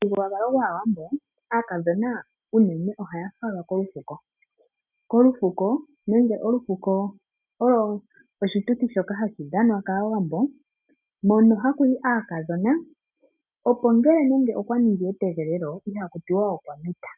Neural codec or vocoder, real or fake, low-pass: none; real; 3.6 kHz